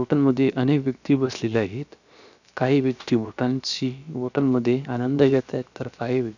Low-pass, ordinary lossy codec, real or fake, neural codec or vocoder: 7.2 kHz; none; fake; codec, 16 kHz, about 1 kbps, DyCAST, with the encoder's durations